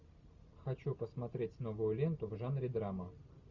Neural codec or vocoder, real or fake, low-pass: none; real; 7.2 kHz